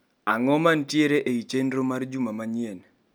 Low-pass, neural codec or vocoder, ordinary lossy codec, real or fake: none; none; none; real